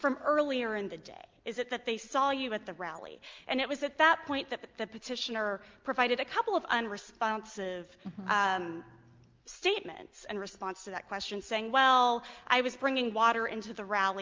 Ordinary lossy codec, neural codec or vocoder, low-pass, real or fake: Opus, 32 kbps; none; 7.2 kHz; real